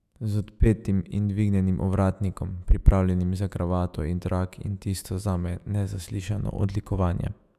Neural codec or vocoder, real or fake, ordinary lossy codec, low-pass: autoencoder, 48 kHz, 128 numbers a frame, DAC-VAE, trained on Japanese speech; fake; none; 14.4 kHz